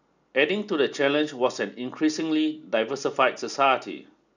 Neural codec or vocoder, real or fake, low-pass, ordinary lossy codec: none; real; 7.2 kHz; none